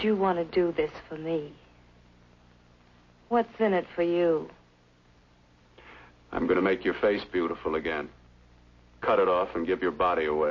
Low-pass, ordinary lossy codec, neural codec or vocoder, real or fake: 7.2 kHz; MP3, 32 kbps; none; real